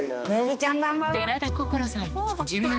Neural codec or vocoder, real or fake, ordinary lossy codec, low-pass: codec, 16 kHz, 2 kbps, X-Codec, HuBERT features, trained on balanced general audio; fake; none; none